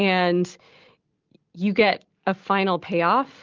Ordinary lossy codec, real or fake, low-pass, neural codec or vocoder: Opus, 32 kbps; real; 7.2 kHz; none